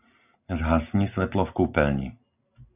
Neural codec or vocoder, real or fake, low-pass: none; real; 3.6 kHz